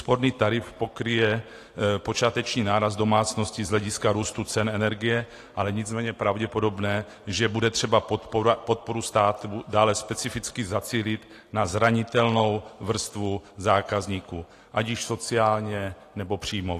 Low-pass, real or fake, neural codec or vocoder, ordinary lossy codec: 14.4 kHz; real; none; AAC, 48 kbps